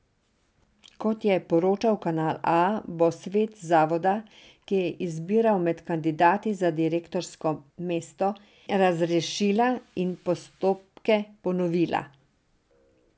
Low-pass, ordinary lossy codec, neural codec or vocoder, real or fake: none; none; none; real